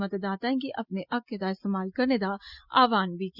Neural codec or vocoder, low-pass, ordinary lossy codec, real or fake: none; 5.4 kHz; Opus, 64 kbps; real